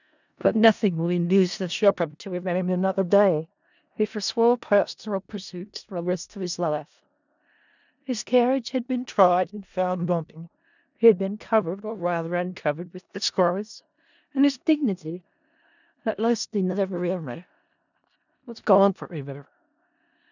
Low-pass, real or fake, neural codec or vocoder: 7.2 kHz; fake; codec, 16 kHz in and 24 kHz out, 0.4 kbps, LongCat-Audio-Codec, four codebook decoder